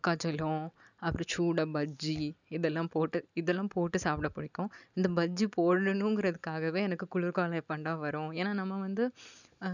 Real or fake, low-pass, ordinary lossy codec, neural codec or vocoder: real; 7.2 kHz; none; none